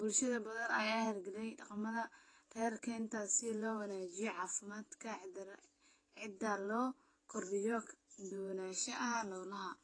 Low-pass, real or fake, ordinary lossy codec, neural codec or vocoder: 9.9 kHz; fake; AAC, 32 kbps; vocoder, 48 kHz, 128 mel bands, Vocos